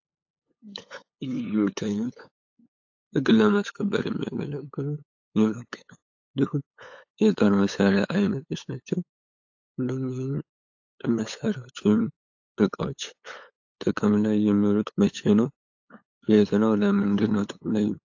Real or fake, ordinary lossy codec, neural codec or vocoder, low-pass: fake; AAC, 48 kbps; codec, 16 kHz, 8 kbps, FunCodec, trained on LibriTTS, 25 frames a second; 7.2 kHz